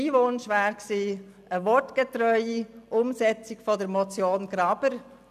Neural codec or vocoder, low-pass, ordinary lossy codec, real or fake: none; 14.4 kHz; none; real